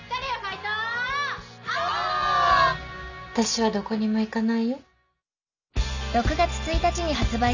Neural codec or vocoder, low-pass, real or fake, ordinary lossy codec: none; 7.2 kHz; real; none